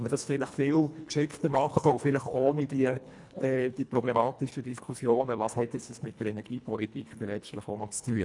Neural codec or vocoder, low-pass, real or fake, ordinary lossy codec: codec, 24 kHz, 1.5 kbps, HILCodec; 10.8 kHz; fake; none